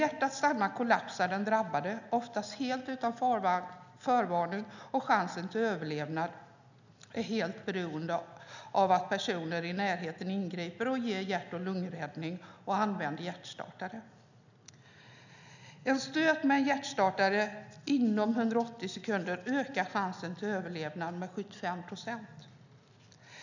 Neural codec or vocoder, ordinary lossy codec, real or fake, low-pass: none; none; real; 7.2 kHz